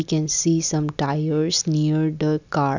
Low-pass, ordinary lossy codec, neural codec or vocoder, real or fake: 7.2 kHz; none; none; real